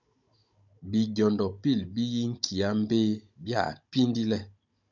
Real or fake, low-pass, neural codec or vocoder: fake; 7.2 kHz; codec, 16 kHz, 16 kbps, FunCodec, trained on Chinese and English, 50 frames a second